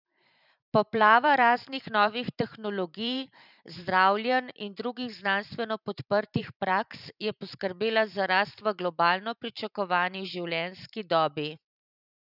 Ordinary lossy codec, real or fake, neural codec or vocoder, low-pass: none; fake; vocoder, 44.1 kHz, 128 mel bands every 512 samples, BigVGAN v2; 5.4 kHz